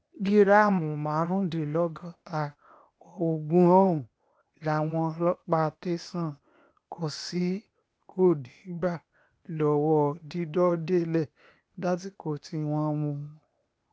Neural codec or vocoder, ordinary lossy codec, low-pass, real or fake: codec, 16 kHz, 0.8 kbps, ZipCodec; none; none; fake